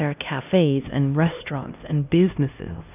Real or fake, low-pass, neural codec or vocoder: fake; 3.6 kHz; codec, 16 kHz, 1 kbps, X-Codec, HuBERT features, trained on LibriSpeech